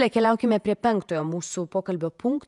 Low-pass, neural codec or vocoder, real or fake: 10.8 kHz; vocoder, 48 kHz, 128 mel bands, Vocos; fake